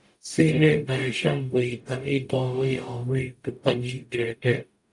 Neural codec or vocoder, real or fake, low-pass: codec, 44.1 kHz, 0.9 kbps, DAC; fake; 10.8 kHz